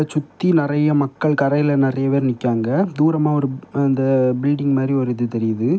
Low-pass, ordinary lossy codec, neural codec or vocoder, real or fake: none; none; none; real